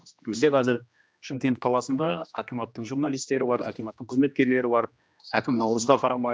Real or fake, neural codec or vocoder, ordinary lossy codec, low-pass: fake; codec, 16 kHz, 1 kbps, X-Codec, HuBERT features, trained on general audio; none; none